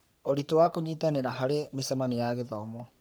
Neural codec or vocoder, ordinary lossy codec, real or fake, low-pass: codec, 44.1 kHz, 3.4 kbps, Pupu-Codec; none; fake; none